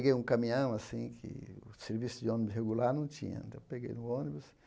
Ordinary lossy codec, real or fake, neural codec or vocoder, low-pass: none; real; none; none